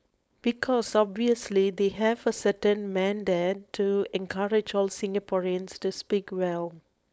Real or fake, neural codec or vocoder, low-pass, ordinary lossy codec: fake; codec, 16 kHz, 4.8 kbps, FACodec; none; none